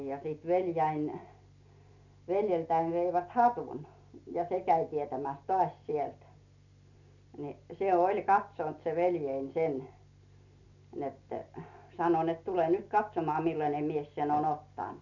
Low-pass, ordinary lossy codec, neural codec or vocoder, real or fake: 7.2 kHz; none; none; real